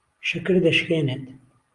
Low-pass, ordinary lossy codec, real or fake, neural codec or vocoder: 10.8 kHz; Opus, 32 kbps; real; none